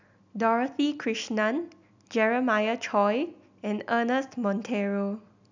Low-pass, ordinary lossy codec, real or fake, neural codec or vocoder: 7.2 kHz; none; real; none